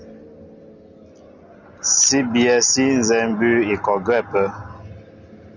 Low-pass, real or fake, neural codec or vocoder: 7.2 kHz; real; none